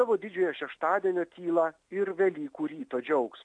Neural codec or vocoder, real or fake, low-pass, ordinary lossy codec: none; real; 9.9 kHz; MP3, 96 kbps